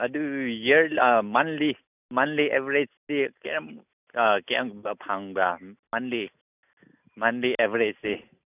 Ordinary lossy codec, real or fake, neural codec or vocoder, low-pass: none; real; none; 3.6 kHz